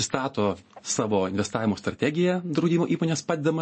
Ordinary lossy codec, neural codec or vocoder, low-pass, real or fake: MP3, 32 kbps; none; 9.9 kHz; real